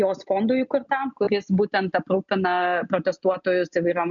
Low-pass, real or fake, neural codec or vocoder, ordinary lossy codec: 7.2 kHz; real; none; MP3, 96 kbps